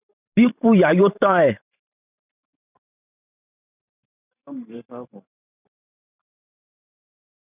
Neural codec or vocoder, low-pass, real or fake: vocoder, 44.1 kHz, 128 mel bands every 512 samples, BigVGAN v2; 3.6 kHz; fake